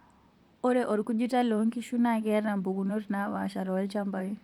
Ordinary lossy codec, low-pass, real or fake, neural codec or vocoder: none; 19.8 kHz; fake; vocoder, 44.1 kHz, 128 mel bands, Pupu-Vocoder